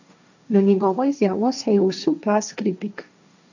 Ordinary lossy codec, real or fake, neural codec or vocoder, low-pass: none; fake; codec, 16 kHz, 1.1 kbps, Voila-Tokenizer; 7.2 kHz